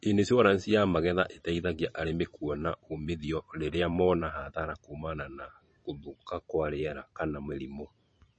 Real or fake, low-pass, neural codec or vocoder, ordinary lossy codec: fake; 9.9 kHz; vocoder, 44.1 kHz, 128 mel bands every 512 samples, BigVGAN v2; MP3, 32 kbps